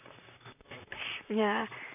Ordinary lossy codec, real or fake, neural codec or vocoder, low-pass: none; fake; vocoder, 44.1 kHz, 128 mel bands, Pupu-Vocoder; 3.6 kHz